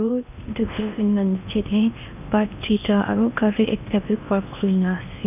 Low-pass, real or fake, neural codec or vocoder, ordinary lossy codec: 3.6 kHz; fake; codec, 16 kHz in and 24 kHz out, 0.8 kbps, FocalCodec, streaming, 65536 codes; none